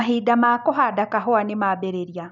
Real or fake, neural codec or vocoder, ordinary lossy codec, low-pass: real; none; none; 7.2 kHz